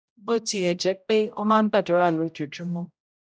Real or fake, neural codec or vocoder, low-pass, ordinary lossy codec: fake; codec, 16 kHz, 0.5 kbps, X-Codec, HuBERT features, trained on general audio; none; none